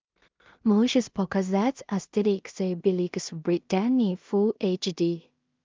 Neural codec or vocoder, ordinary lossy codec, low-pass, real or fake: codec, 16 kHz in and 24 kHz out, 0.4 kbps, LongCat-Audio-Codec, two codebook decoder; Opus, 32 kbps; 7.2 kHz; fake